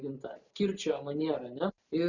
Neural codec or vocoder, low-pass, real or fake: none; 7.2 kHz; real